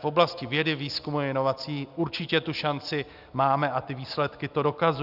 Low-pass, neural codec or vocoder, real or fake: 5.4 kHz; none; real